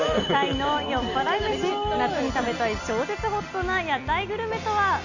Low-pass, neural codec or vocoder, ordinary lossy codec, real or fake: 7.2 kHz; none; none; real